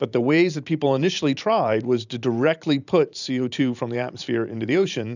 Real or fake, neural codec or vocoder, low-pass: fake; vocoder, 44.1 kHz, 128 mel bands every 512 samples, BigVGAN v2; 7.2 kHz